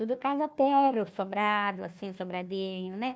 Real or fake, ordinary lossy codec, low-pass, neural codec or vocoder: fake; none; none; codec, 16 kHz, 1 kbps, FunCodec, trained on Chinese and English, 50 frames a second